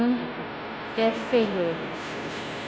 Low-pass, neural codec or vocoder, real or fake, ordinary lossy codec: none; codec, 16 kHz, 0.5 kbps, FunCodec, trained on Chinese and English, 25 frames a second; fake; none